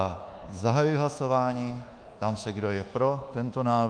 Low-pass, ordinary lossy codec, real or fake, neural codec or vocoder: 9.9 kHz; Opus, 32 kbps; fake; codec, 24 kHz, 1.2 kbps, DualCodec